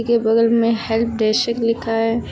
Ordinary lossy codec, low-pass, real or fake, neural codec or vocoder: none; none; real; none